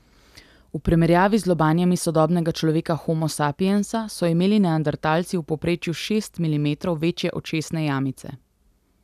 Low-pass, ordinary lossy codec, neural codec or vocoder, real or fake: 14.4 kHz; none; none; real